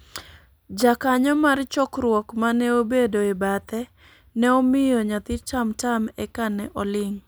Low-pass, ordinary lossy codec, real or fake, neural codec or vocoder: none; none; real; none